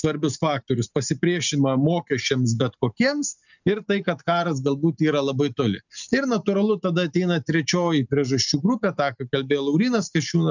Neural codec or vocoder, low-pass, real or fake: none; 7.2 kHz; real